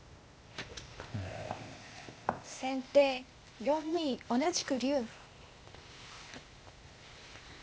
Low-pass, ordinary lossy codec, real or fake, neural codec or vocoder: none; none; fake; codec, 16 kHz, 0.8 kbps, ZipCodec